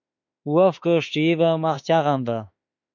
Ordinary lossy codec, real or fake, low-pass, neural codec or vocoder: MP3, 64 kbps; fake; 7.2 kHz; autoencoder, 48 kHz, 32 numbers a frame, DAC-VAE, trained on Japanese speech